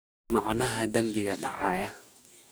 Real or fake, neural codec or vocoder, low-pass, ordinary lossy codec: fake; codec, 44.1 kHz, 2.6 kbps, DAC; none; none